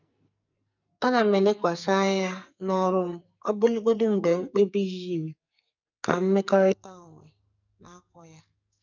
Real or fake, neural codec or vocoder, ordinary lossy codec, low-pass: fake; codec, 44.1 kHz, 2.6 kbps, SNAC; none; 7.2 kHz